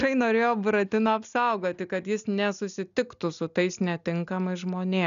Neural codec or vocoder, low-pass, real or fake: none; 7.2 kHz; real